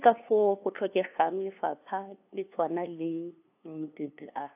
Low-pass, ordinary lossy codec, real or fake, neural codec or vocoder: 3.6 kHz; AAC, 32 kbps; fake; codec, 16 kHz, 2 kbps, FunCodec, trained on LibriTTS, 25 frames a second